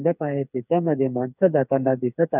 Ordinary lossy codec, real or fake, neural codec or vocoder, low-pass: none; fake; codec, 16 kHz, 4 kbps, FreqCodec, smaller model; 3.6 kHz